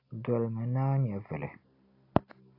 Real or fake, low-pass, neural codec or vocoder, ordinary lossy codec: real; 5.4 kHz; none; AAC, 32 kbps